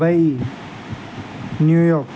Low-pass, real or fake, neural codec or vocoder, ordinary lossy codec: none; real; none; none